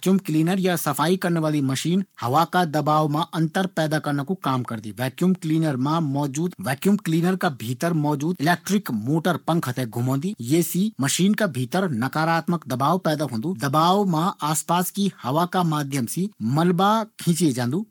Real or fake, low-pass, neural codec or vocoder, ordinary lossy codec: fake; 19.8 kHz; codec, 44.1 kHz, 7.8 kbps, Pupu-Codec; MP3, 96 kbps